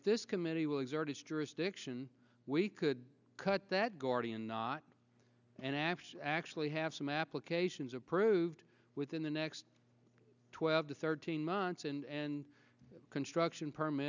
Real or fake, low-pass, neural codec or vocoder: real; 7.2 kHz; none